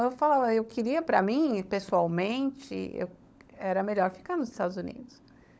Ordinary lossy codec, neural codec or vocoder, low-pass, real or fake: none; codec, 16 kHz, 16 kbps, FunCodec, trained on LibriTTS, 50 frames a second; none; fake